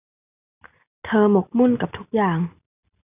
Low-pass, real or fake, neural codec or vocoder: 3.6 kHz; real; none